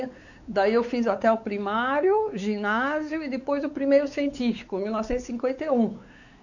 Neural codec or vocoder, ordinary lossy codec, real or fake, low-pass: codec, 16 kHz, 4 kbps, X-Codec, WavLM features, trained on Multilingual LibriSpeech; none; fake; 7.2 kHz